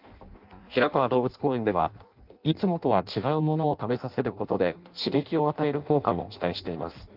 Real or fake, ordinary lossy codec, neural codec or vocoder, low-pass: fake; Opus, 24 kbps; codec, 16 kHz in and 24 kHz out, 0.6 kbps, FireRedTTS-2 codec; 5.4 kHz